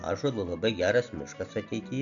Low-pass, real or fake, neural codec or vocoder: 7.2 kHz; real; none